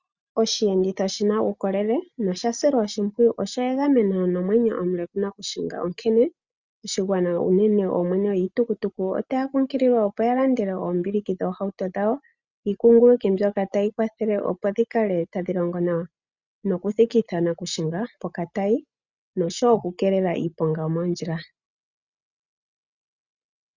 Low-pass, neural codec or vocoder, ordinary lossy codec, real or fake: 7.2 kHz; none; Opus, 64 kbps; real